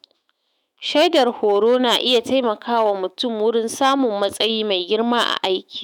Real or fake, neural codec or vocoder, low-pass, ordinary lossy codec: fake; autoencoder, 48 kHz, 128 numbers a frame, DAC-VAE, trained on Japanese speech; 19.8 kHz; none